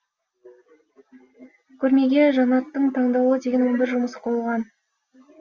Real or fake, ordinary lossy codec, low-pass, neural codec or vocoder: real; Opus, 32 kbps; 7.2 kHz; none